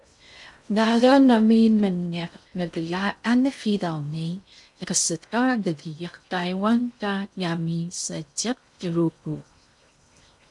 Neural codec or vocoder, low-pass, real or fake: codec, 16 kHz in and 24 kHz out, 0.6 kbps, FocalCodec, streaming, 2048 codes; 10.8 kHz; fake